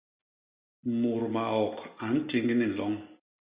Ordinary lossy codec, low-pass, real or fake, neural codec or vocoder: Opus, 64 kbps; 3.6 kHz; real; none